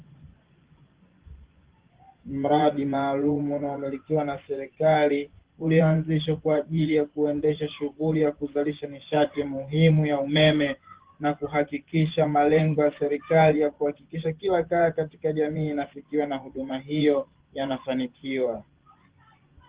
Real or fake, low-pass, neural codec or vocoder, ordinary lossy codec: fake; 3.6 kHz; vocoder, 24 kHz, 100 mel bands, Vocos; Opus, 16 kbps